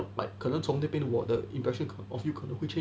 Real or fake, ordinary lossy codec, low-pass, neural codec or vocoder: real; none; none; none